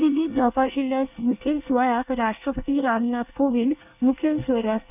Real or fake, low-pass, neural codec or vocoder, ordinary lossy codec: fake; 3.6 kHz; codec, 24 kHz, 1 kbps, SNAC; none